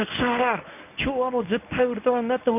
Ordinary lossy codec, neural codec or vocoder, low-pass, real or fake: none; codec, 24 kHz, 0.9 kbps, WavTokenizer, medium speech release version 1; 3.6 kHz; fake